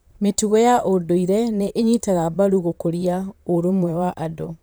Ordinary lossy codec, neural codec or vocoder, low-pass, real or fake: none; vocoder, 44.1 kHz, 128 mel bands, Pupu-Vocoder; none; fake